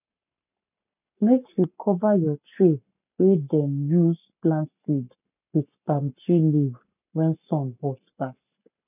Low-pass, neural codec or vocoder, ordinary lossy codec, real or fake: 3.6 kHz; codec, 44.1 kHz, 7.8 kbps, Pupu-Codec; none; fake